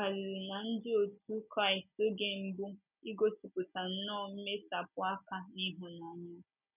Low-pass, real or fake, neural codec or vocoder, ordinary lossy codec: 3.6 kHz; real; none; none